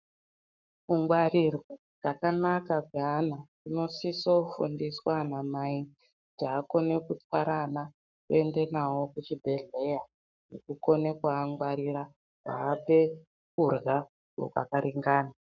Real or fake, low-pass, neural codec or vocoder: fake; 7.2 kHz; codec, 44.1 kHz, 7.8 kbps, Pupu-Codec